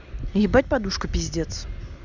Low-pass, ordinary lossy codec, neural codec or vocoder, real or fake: 7.2 kHz; none; none; real